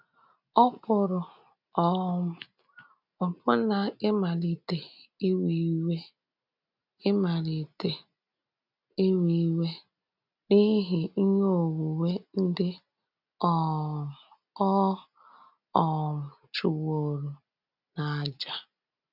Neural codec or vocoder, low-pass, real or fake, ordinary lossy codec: none; 5.4 kHz; real; none